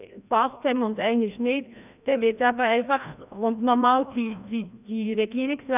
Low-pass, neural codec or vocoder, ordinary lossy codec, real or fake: 3.6 kHz; codec, 16 kHz, 1 kbps, FreqCodec, larger model; none; fake